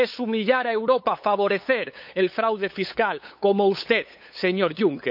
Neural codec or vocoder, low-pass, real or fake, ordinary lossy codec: codec, 16 kHz, 8 kbps, FunCodec, trained on LibriTTS, 25 frames a second; 5.4 kHz; fake; none